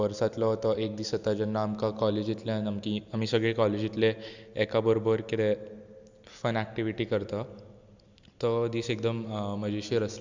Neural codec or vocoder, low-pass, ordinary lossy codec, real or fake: none; 7.2 kHz; Opus, 64 kbps; real